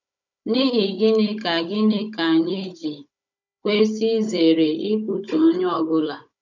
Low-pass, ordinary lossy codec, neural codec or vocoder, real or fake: 7.2 kHz; none; codec, 16 kHz, 16 kbps, FunCodec, trained on Chinese and English, 50 frames a second; fake